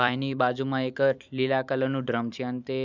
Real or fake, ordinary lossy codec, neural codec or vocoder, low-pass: fake; none; vocoder, 44.1 kHz, 128 mel bands every 256 samples, BigVGAN v2; 7.2 kHz